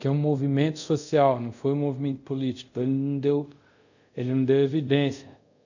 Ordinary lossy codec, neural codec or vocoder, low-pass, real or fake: none; codec, 24 kHz, 0.5 kbps, DualCodec; 7.2 kHz; fake